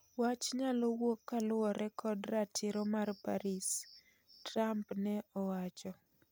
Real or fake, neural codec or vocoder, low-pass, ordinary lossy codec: real; none; none; none